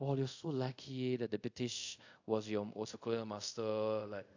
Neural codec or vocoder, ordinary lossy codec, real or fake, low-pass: codec, 24 kHz, 0.5 kbps, DualCodec; AAC, 48 kbps; fake; 7.2 kHz